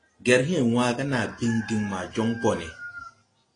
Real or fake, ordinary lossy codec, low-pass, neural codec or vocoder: real; AAC, 32 kbps; 9.9 kHz; none